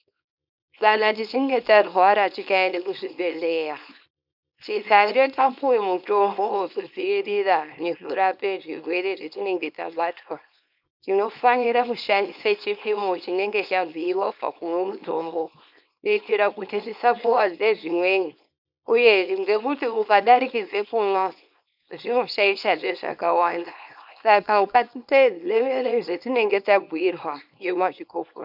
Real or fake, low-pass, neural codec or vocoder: fake; 5.4 kHz; codec, 24 kHz, 0.9 kbps, WavTokenizer, small release